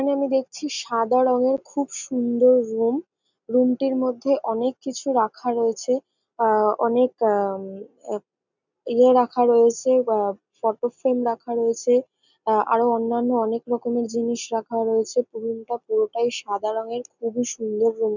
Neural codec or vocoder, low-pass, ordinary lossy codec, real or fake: none; 7.2 kHz; none; real